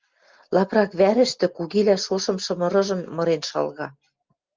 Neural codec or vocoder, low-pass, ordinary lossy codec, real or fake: none; 7.2 kHz; Opus, 32 kbps; real